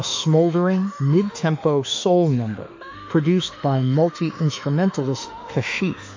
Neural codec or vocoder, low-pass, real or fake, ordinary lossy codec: autoencoder, 48 kHz, 32 numbers a frame, DAC-VAE, trained on Japanese speech; 7.2 kHz; fake; MP3, 48 kbps